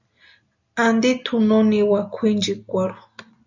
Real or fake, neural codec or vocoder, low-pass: real; none; 7.2 kHz